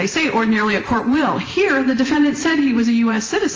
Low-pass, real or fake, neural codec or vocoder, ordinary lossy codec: 7.2 kHz; fake; vocoder, 44.1 kHz, 128 mel bands every 512 samples, BigVGAN v2; Opus, 24 kbps